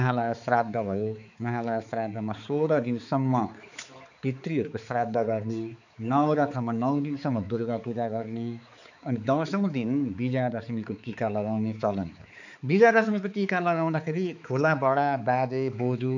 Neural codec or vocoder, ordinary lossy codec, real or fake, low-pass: codec, 16 kHz, 4 kbps, X-Codec, HuBERT features, trained on balanced general audio; none; fake; 7.2 kHz